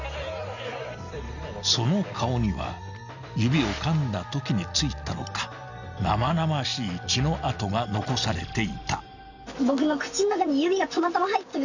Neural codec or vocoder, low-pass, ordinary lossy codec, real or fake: none; 7.2 kHz; none; real